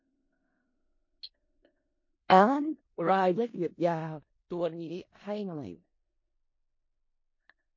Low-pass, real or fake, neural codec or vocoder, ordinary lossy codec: 7.2 kHz; fake; codec, 16 kHz in and 24 kHz out, 0.4 kbps, LongCat-Audio-Codec, four codebook decoder; MP3, 32 kbps